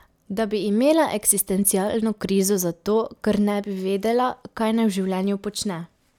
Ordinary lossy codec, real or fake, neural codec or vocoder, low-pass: none; real; none; 19.8 kHz